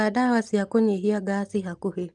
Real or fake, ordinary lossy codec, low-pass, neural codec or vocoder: real; Opus, 32 kbps; 10.8 kHz; none